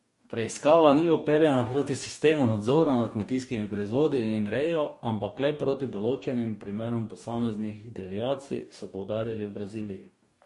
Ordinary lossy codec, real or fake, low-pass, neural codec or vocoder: MP3, 48 kbps; fake; 14.4 kHz; codec, 44.1 kHz, 2.6 kbps, DAC